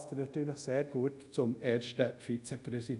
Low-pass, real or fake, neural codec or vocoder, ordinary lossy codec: 10.8 kHz; fake; codec, 24 kHz, 0.5 kbps, DualCodec; none